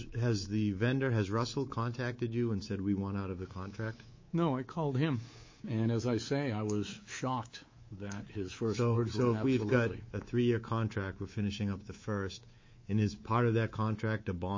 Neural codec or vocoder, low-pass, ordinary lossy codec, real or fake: codec, 24 kHz, 3.1 kbps, DualCodec; 7.2 kHz; MP3, 32 kbps; fake